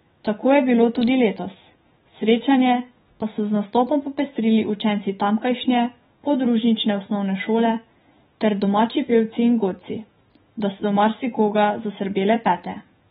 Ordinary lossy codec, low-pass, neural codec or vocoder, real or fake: AAC, 16 kbps; 19.8 kHz; autoencoder, 48 kHz, 128 numbers a frame, DAC-VAE, trained on Japanese speech; fake